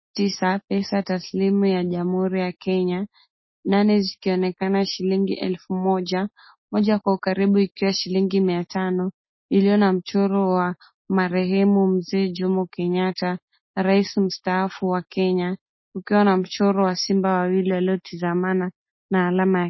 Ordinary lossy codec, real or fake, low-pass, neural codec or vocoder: MP3, 24 kbps; real; 7.2 kHz; none